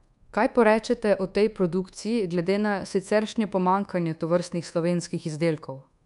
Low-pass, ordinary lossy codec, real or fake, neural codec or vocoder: 10.8 kHz; none; fake; codec, 24 kHz, 1.2 kbps, DualCodec